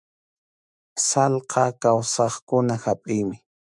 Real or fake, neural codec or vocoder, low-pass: fake; autoencoder, 48 kHz, 128 numbers a frame, DAC-VAE, trained on Japanese speech; 10.8 kHz